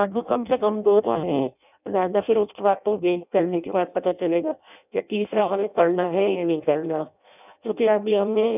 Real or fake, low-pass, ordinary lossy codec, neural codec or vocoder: fake; 3.6 kHz; none; codec, 16 kHz in and 24 kHz out, 0.6 kbps, FireRedTTS-2 codec